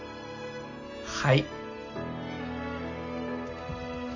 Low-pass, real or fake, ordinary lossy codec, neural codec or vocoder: 7.2 kHz; real; none; none